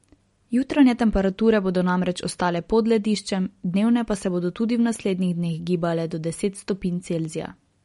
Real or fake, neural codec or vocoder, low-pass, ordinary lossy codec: real; none; 19.8 kHz; MP3, 48 kbps